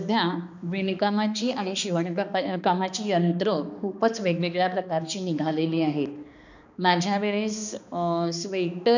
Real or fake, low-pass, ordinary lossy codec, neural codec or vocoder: fake; 7.2 kHz; none; codec, 16 kHz, 2 kbps, X-Codec, HuBERT features, trained on balanced general audio